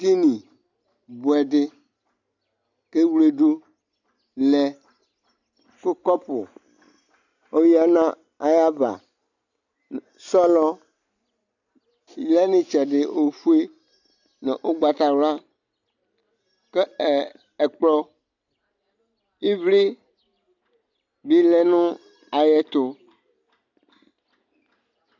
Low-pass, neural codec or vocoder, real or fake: 7.2 kHz; none; real